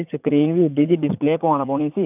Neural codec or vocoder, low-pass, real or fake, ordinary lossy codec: vocoder, 44.1 kHz, 80 mel bands, Vocos; 3.6 kHz; fake; none